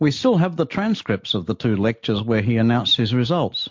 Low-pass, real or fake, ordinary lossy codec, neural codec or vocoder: 7.2 kHz; real; MP3, 48 kbps; none